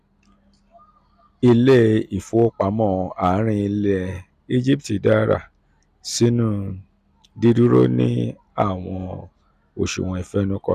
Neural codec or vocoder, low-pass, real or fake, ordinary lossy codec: none; 14.4 kHz; real; none